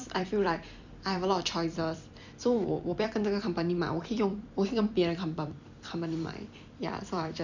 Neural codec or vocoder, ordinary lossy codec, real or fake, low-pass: vocoder, 44.1 kHz, 128 mel bands every 256 samples, BigVGAN v2; none; fake; 7.2 kHz